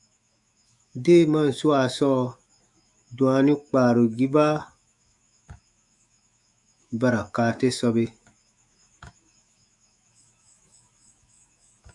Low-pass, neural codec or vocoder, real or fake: 10.8 kHz; autoencoder, 48 kHz, 128 numbers a frame, DAC-VAE, trained on Japanese speech; fake